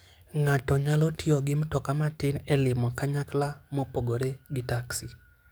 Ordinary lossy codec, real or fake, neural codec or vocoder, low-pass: none; fake; codec, 44.1 kHz, 7.8 kbps, DAC; none